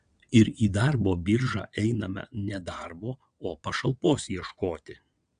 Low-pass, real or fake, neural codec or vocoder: 9.9 kHz; fake; vocoder, 22.05 kHz, 80 mel bands, WaveNeXt